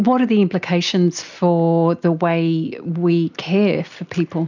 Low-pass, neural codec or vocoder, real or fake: 7.2 kHz; none; real